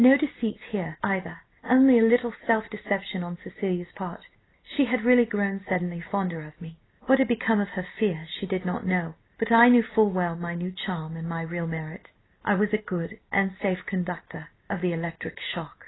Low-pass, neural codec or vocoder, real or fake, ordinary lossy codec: 7.2 kHz; none; real; AAC, 16 kbps